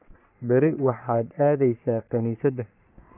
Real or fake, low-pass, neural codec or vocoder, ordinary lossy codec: fake; 3.6 kHz; codec, 44.1 kHz, 3.4 kbps, Pupu-Codec; none